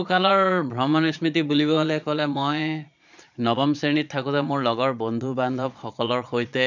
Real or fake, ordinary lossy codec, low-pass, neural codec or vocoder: fake; none; 7.2 kHz; vocoder, 22.05 kHz, 80 mel bands, Vocos